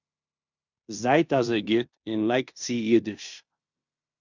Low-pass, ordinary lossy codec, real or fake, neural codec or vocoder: 7.2 kHz; Opus, 64 kbps; fake; codec, 16 kHz in and 24 kHz out, 0.9 kbps, LongCat-Audio-Codec, fine tuned four codebook decoder